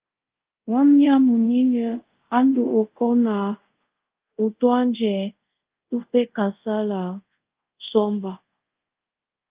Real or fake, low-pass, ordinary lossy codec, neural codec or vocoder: fake; 3.6 kHz; Opus, 32 kbps; codec, 24 kHz, 0.5 kbps, DualCodec